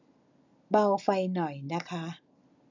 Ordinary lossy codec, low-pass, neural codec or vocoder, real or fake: none; 7.2 kHz; none; real